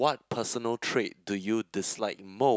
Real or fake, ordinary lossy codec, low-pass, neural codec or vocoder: real; none; none; none